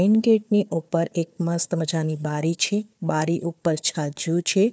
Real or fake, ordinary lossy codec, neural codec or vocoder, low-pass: fake; none; codec, 16 kHz, 4 kbps, FunCodec, trained on Chinese and English, 50 frames a second; none